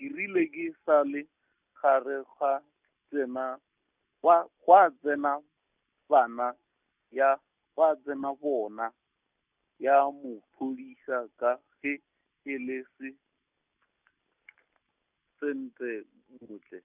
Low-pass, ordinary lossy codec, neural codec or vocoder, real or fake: 3.6 kHz; none; none; real